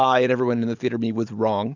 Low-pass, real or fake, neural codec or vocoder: 7.2 kHz; real; none